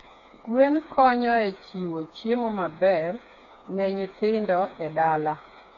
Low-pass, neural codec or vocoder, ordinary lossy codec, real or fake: 7.2 kHz; codec, 16 kHz, 4 kbps, FreqCodec, smaller model; none; fake